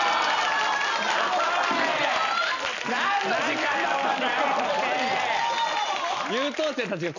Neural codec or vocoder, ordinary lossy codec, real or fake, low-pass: none; none; real; 7.2 kHz